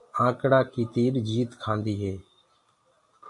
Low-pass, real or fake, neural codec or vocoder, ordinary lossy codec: 10.8 kHz; real; none; MP3, 48 kbps